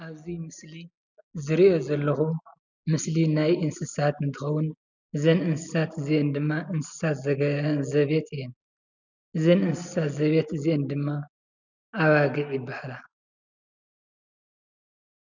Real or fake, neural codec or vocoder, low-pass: real; none; 7.2 kHz